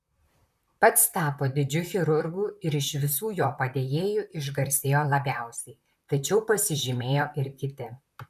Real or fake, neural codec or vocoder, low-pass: fake; vocoder, 44.1 kHz, 128 mel bands, Pupu-Vocoder; 14.4 kHz